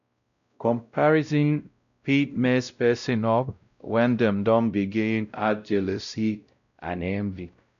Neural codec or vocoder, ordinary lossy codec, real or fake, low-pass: codec, 16 kHz, 0.5 kbps, X-Codec, WavLM features, trained on Multilingual LibriSpeech; none; fake; 7.2 kHz